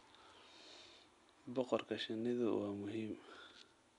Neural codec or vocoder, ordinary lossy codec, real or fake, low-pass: none; none; real; 10.8 kHz